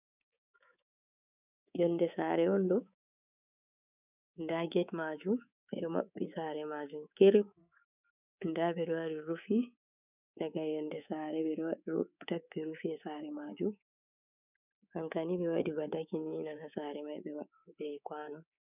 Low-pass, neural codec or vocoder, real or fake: 3.6 kHz; codec, 24 kHz, 3.1 kbps, DualCodec; fake